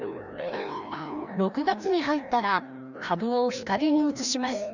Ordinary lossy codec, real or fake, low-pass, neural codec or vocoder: none; fake; 7.2 kHz; codec, 16 kHz, 1 kbps, FreqCodec, larger model